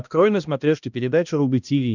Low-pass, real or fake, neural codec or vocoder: 7.2 kHz; fake; codec, 16 kHz, 1 kbps, X-Codec, HuBERT features, trained on balanced general audio